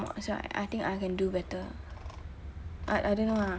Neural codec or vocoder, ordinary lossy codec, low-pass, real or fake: none; none; none; real